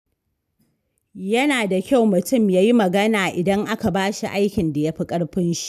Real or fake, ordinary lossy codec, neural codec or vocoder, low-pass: real; none; none; 14.4 kHz